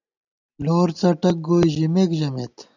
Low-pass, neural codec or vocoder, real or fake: 7.2 kHz; none; real